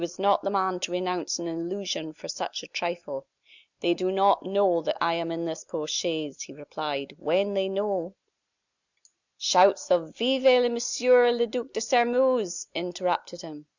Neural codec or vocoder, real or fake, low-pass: none; real; 7.2 kHz